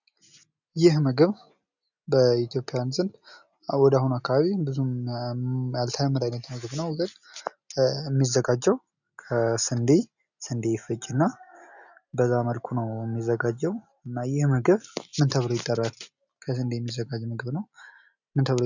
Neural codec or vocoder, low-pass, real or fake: none; 7.2 kHz; real